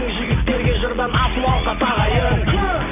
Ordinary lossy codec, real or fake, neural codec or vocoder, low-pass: none; real; none; 3.6 kHz